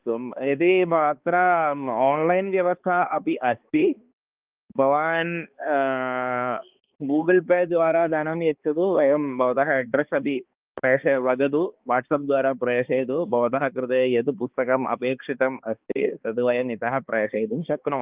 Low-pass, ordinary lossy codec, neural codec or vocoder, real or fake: 3.6 kHz; Opus, 32 kbps; codec, 16 kHz, 2 kbps, X-Codec, HuBERT features, trained on balanced general audio; fake